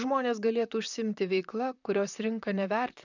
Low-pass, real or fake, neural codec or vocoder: 7.2 kHz; fake; vocoder, 44.1 kHz, 128 mel bands, Pupu-Vocoder